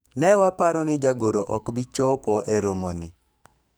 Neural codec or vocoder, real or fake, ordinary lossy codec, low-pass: codec, 44.1 kHz, 2.6 kbps, SNAC; fake; none; none